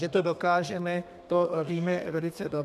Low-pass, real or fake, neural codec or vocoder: 14.4 kHz; fake; codec, 32 kHz, 1.9 kbps, SNAC